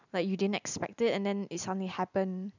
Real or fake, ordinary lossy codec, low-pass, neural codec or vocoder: real; none; 7.2 kHz; none